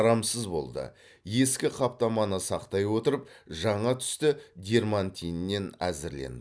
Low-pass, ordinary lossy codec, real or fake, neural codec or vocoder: none; none; real; none